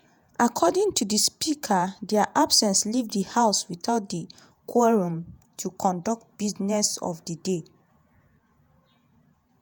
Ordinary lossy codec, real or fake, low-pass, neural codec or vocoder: none; fake; none; vocoder, 48 kHz, 128 mel bands, Vocos